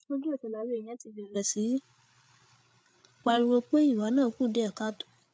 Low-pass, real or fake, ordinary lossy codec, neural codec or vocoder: none; fake; none; codec, 16 kHz, 8 kbps, FreqCodec, larger model